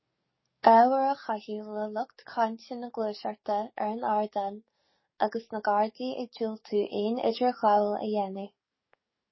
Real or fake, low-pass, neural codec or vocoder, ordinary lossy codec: fake; 7.2 kHz; codec, 44.1 kHz, 7.8 kbps, DAC; MP3, 24 kbps